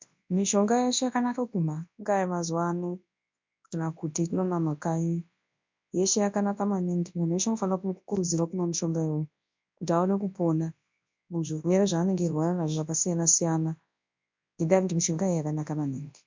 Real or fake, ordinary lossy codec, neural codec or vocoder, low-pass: fake; MP3, 64 kbps; codec, 24 kHz, 0.9 kbps, WavTokenizer, large speech release; 7.2 kHz